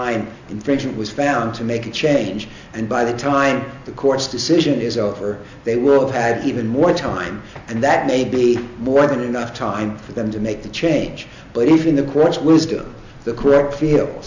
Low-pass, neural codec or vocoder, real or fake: 7.2 kHz; none; real